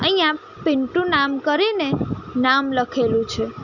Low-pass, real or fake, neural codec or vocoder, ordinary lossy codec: 7.2 kHz; real; none; none